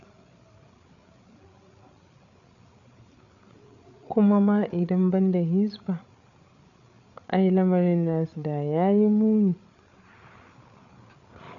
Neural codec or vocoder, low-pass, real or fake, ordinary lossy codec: codec, 16 kHz, 8 kbps, FreqCodec, larger model; 7.2 kHz; fake; none